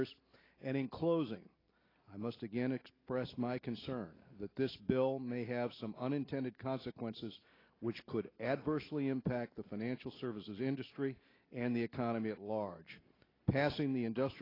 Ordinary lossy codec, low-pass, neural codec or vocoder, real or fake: AAC, 24 kbps; 5.4 kHz; none; real